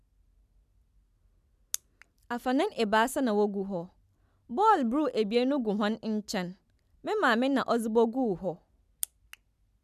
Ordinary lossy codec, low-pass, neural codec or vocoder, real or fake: none; 14.4 kHz; none; real